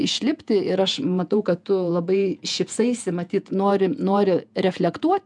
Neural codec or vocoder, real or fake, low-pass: vocoder, 48 kHz, 128 mel bands, Vocos; fake; 10.8 kHz